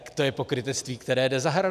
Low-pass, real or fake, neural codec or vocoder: 14.4 kHz; real; none